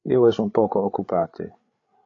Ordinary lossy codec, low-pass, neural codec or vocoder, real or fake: AAC, 48 kbps; 7.2 kHz; codec, 16 kHz, 8 kbps, FreqCodec, larger model; fake